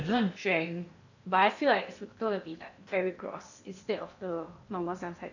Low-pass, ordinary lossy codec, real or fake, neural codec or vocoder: 7.2 kHz; none; fake; codec, 16 kHz in and 24 kHz out, 0.8 kbps, FocalCodec, streaming, 65536 codes